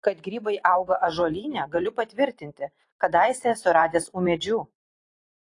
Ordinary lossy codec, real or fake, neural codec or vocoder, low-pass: AAC, 48 kbps; fake; vocoder, 44.1 kHz, 128 mel bands every 512 samples, BigVGAN v2; 10.8 kHz